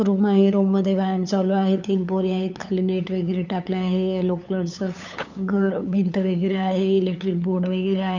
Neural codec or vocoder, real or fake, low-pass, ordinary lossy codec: codec, 16 kHz, 8 kbps, FunCodec, trained on LibriTTS, 25 frames a second; fake; 7.2 kHz; none